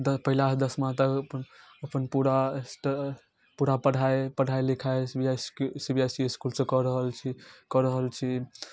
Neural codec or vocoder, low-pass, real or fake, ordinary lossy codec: none; none; real; none